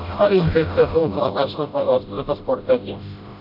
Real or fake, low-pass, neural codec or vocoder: fake; 5.4 kHz; codec, 16 kHz, 0.5 kbps, FreqCodec, smaller model